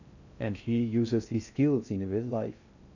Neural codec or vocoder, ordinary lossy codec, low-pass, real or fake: codec, 16 kHz in and 24 kHz out, 0.6 kbps, FocalCodec, streaming, 2048 codes; none; 7.2 kHz; fake